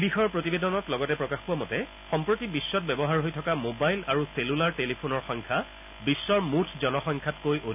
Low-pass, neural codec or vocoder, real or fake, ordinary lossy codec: 3.6 kHz; none; real; none